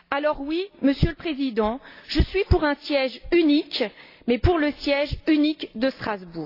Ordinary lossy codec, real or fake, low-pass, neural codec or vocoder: AAC, 32 kbps; real; 5.4 kHz; none